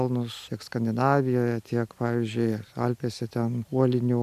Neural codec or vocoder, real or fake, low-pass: none; real; 14.4 kHz